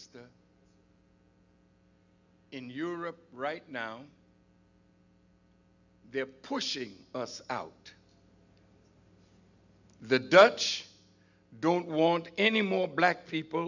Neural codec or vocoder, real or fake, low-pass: none; real; 7.2 kHz